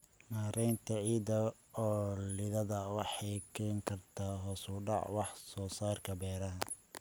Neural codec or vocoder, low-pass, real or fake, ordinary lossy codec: none; none; real; none